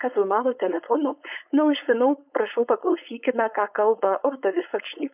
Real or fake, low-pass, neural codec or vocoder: fake; 3.6 kHz; codec, 16 kHz, 4.8 kbps, FACodec